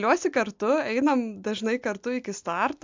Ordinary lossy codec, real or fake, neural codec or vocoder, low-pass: MP3, 64 kbps; real; none; 7.2 kHz